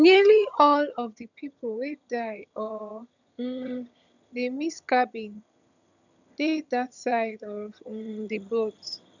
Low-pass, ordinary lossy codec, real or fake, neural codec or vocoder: 7.2 kHz; none; fake; vocoder, 22.05 kHz, 80 mel bands, HiFi-GAN